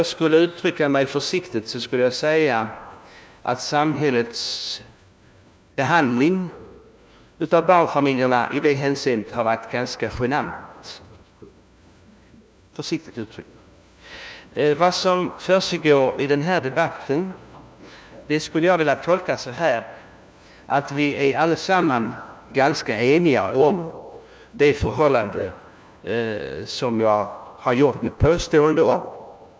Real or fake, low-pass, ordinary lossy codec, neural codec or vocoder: fake; none; none; codec, 16 kHz, 1 kbps, FunCodec, trained on LibriTTS, 50 frames a second